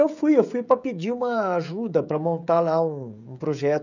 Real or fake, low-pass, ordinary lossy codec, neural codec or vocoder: fake; 7.2 kHz; none; codec, 16 kHz, 16 kbps, FreqCodec, smaller model